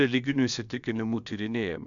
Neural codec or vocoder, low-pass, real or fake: codec, 16 kHz, about 1 kbps, DyCAST, with the encoder's durations; 7.2 kHz; fake